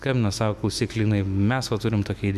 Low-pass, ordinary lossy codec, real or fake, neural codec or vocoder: 14.4 kHz; MP3, 96 kbps; fake; autoencoder, 48 kHz, 128 numbers a frame, DAC-VAE, trained on Japanese speech